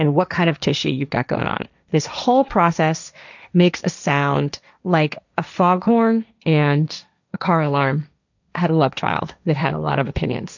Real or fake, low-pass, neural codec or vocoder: fake; 7.2 kHz; codec, 16 kHz, 1.1 kbps, Voila-Tokenizer